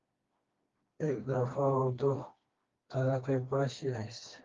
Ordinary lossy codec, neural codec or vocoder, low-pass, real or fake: Opus, 32 kbps; codec, 16 kHz, 2 kbps, FreqCodec, smaller model; 7.2 kHz; fake